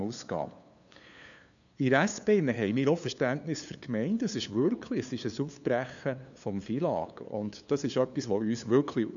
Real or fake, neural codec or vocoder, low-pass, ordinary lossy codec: fake; codec, 16 kHz, 2 kbps, FunCodec, trained on LibriTTS, 25 frames a second; 7.2 kHz; none